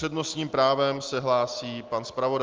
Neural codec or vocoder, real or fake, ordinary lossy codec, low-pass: none; real; Opus, 24 kbps; 7.2 kHz